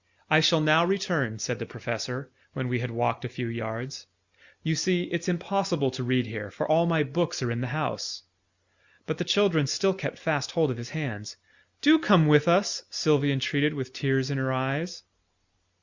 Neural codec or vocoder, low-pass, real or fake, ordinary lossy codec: none; 7.2 kHz; real; Opus, 64 kbps